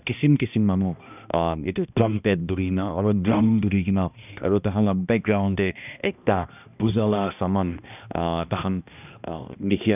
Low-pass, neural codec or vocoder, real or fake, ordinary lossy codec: 3.6 kHz; codec, 16 kHz, 1 kbps, X-Codec, HuBERT features, trained on balanced general audio; fake; none